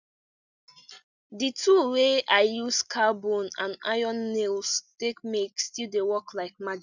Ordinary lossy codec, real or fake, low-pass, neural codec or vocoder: none; real; 7.2 kHz; none